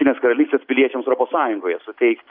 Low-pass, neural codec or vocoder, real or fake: 5.4 kHz; none; real